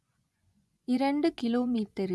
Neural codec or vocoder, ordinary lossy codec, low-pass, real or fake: vocoder, 24 kHz, 100 mel bands, Vocos; none; none; fake